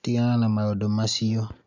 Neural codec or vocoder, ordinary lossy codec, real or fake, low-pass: none; none; real; 7.2 kHz